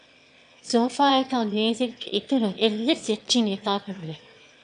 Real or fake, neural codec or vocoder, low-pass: fake; autoencoder, 22.05 kHz, a latent of 192 numbers a frame, VITS, trained on one speaker; 9.9 kHz